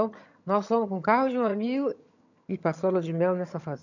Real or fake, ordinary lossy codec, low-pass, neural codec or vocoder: fake; none; 7.2 kHz; vocoder, 22.05 kHz, 80 mel bands, HiFi-GAN